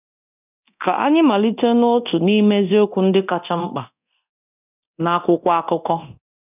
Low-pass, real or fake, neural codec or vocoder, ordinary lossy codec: 3.6 kHz; fake; codec, 24 kHz, 0.9 kbps, DualCodec; none